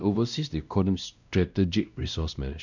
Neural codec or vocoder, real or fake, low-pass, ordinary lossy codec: codec, 16 kHz, 1 kbps, X-Codec, WavLM features, trained on Multilingual LibriSpeech; fake; 7.2 kHz; none